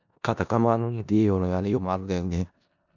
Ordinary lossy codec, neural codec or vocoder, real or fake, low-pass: none; codec, 16 kHz in and 24 kHz out, 0.4 kbps, LongCat-Audio-Codec, four codebook decoder; fake; 7.2 kHz